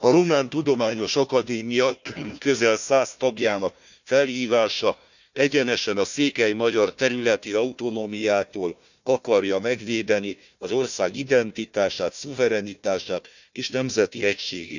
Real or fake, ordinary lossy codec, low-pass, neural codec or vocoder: fake; none; 7.2 kHz; codec, 16 kHz, 1 kbps, FunCodec, trained on Chinese and English, 50 frames a second